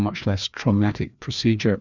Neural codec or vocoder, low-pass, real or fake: codec, 16 kHz, 2 kbps, FreqCodec, larger model; 7.2 kHz; fake